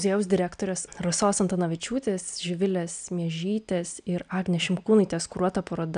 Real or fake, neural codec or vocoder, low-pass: real; none; 9.9 kHz